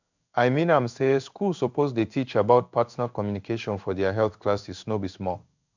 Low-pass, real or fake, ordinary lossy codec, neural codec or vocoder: 7.2 kHz; fake; none; codec, 16 kHz in and 24 kHz out, 1 kbps, XY-Tokenizer